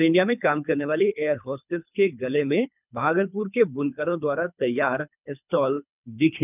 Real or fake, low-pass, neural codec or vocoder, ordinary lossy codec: fake; 3.6 kHz; codec, 24 kHz, 3 kbps, HILCodec; none